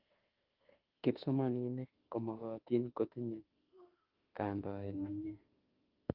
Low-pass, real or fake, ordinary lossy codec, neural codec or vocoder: 5.4 kHz; fake; Opus, 32 kbps; autoencoder, 48 kHz, 32 numbers a frame, DAC-VAE, trained on Japanese speech